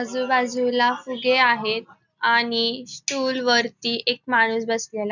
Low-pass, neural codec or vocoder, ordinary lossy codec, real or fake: 7.2 kHz; none; none; real